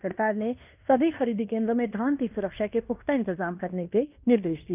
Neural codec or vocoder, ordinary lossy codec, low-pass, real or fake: codec, 16 kHz in and 24 kHz out, 0.9 kbps, LongCat-Audio-Codec, fine tuned four codebook decoder; none; 3.6 kHz; fake